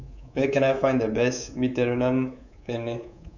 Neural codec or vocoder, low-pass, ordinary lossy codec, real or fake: codec, 24 kHz, 3.1 kbps, DualCodec; 7.2 kHz; none; fake